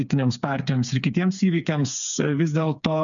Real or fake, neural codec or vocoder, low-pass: fake; codec, 16 kHz, 4 kbps, FreqCodec, smaller model; 7.2 kHz